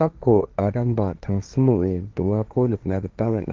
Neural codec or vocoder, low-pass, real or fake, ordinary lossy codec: codec, 16 kHz, 1.1 kbps, Voila-Tokenizer; 7.2 kHz; fake; Opus, 32 kbps